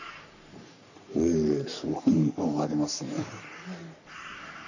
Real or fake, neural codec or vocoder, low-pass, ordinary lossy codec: fake; codec, 44.1 kHz, 3.4 kbps, Pupu-Codec; 7.2 kHz; none